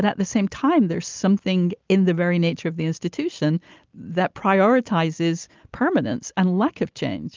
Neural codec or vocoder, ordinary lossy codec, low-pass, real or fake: none; Opus, 24 kbps; 7.2 kHz; real